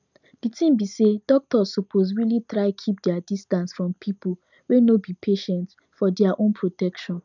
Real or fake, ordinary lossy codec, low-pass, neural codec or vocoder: real; none; 7.2 kHz; none